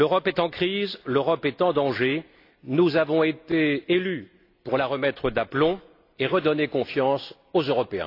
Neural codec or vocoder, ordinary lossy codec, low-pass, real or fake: none; AAC, 32 kbps; 5.4 kHz; real